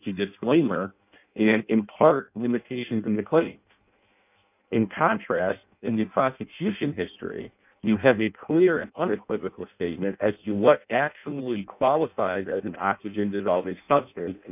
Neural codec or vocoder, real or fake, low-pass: codec, 16 kHz in and 24 kHz out, 0.6 kbps, FireRedTTS-2 codec; fake; 3.6 kHz